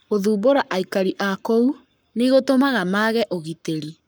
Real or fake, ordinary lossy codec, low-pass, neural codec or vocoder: fake; none; none; codec, 44.1 kHz, 7.8 kbps, Pupu-Codec